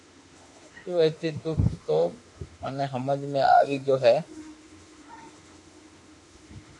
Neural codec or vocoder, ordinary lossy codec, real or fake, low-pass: autoencoder, 48 kHz, 32 numbers a frame, DAC-VAE, trained on Japanese speech; AAC, 64 kbps; fake; 10.8 kHz